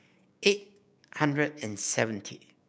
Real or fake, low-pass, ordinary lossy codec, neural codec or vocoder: fake; none; none; codec, 16 kHz, 6 kbps, DAC